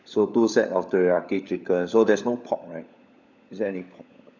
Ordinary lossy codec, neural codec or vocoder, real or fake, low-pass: none; codec, 16 kHz, 16 kbps, FunCodec, trained on LibriTTS, 50 frames a second; fake; 7.2 kHz